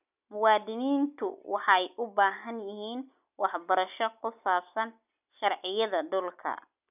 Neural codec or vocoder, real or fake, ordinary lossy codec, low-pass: none; real; none; 3.6 kHz